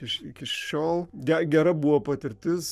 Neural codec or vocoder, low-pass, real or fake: codec, 44.1 kHz, 7.8 kbps, Pupu-Codec; 14.4 kHz; fake